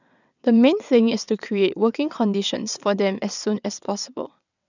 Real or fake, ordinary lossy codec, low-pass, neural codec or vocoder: fake; none; 7.2 kHz; vocoder, 22.05 kHz, 80 mel bands, WaveNeXt